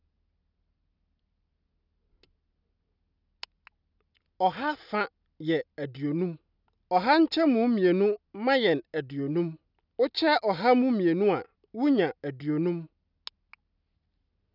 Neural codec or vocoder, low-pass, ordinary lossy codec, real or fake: none; 5.4 kHz; none; real